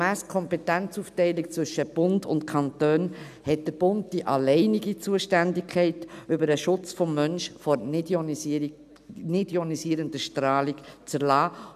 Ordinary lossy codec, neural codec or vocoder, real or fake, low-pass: none; none; real; 14.4 kHz